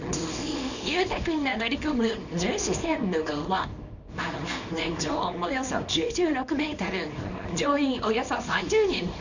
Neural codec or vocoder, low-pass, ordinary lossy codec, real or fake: codec, 24 kHz, 0.9 kbps, WavTokenizer, small release; 7.2 kHz; none; fake